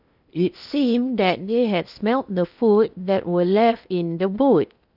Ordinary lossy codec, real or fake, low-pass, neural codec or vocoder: none; fake; 5.4 kHz; codec, 16 kHz in and 24 kHz out, 0.8 kbps, FocalCodec, streaming, 65536 codes